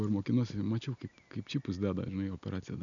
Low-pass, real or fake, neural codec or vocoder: 7.2 kHz; real; none